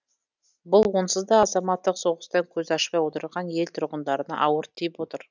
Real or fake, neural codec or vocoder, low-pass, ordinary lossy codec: real; none; 7.2 kHz; none